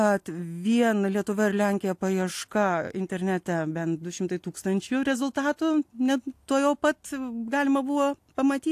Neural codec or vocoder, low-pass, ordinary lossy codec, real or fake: none; 14.4 kHz; AAC, 64 kbps; real